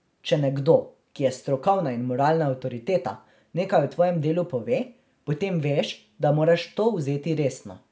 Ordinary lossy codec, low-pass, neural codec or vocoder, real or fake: none; none; none; real